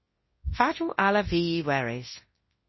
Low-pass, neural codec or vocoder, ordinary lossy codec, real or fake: 7.2 kHz; codec, 24 kHz, 0.9 kbps, WavTokenizer, large speech release; MP3, 24 kbps; fake